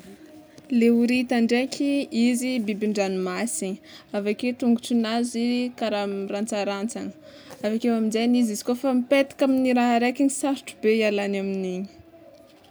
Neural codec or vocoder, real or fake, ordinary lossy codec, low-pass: none; real; none; none